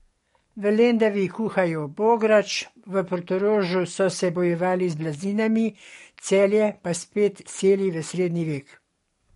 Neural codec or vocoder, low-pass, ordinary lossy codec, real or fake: codec, 44.1 kHz, 7.8 kbps, DAC; 19.8 kHz; MP3, 48 kbps; fake